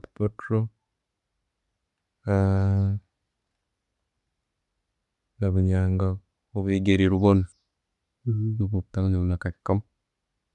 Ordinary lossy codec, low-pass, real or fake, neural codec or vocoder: none; 10.8 kHz; real; none